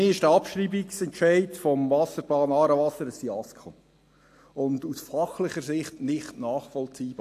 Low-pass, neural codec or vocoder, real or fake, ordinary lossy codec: 14.4 kHz; none; real; AAC, 64 kbps